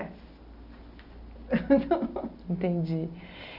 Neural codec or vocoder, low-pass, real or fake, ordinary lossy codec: none; 5.4 kHz; real; none